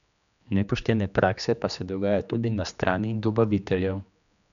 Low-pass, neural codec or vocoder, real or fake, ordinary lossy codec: 7.2 kHz; codec, 16 kHz, 2 kbps, X-Codec, HuBERT features, trained on general audio; fake; none